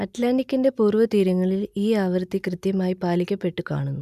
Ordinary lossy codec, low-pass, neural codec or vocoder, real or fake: none; 14.4 kHz; none; real